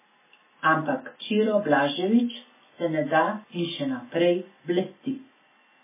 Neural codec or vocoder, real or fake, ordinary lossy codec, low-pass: none; real; MP3, 16 kbps; 3.6 kHz